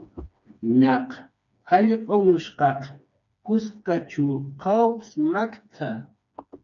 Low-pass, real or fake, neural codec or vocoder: 7.2 kHz; fake; codec, 16 kHz, 2 kbps, FreqCodec, smaller model